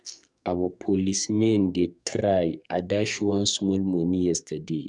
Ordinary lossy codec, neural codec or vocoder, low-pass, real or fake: none; codec, 44.1 kHz, 2.6 kbps, SNAC; 10.8 kHz; fake